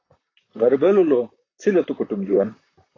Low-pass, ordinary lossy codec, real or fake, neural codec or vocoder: 7.2 kHz; AAC, 32 kbps; fake; vocoder, 44.1 kHz, 128 mel bands, Pupu-Vocoder